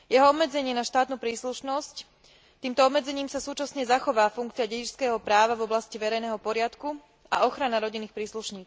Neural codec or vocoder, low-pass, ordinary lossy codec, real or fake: none; none; none; real